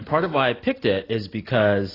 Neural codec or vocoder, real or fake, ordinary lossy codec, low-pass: none; real; AAC, 24 kbps; 5.4 kHz